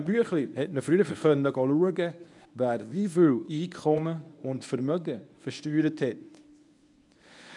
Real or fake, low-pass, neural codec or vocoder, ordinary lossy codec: fake; 10.8 kHz; codec, 24 kHz, 0.9 kbps, WavTokenizer, medium speech release version 1; none